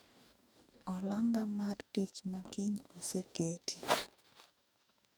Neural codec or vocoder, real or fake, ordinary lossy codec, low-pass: codec, 44.1 kHz, 2.6 kbps, DAC; fake; none; none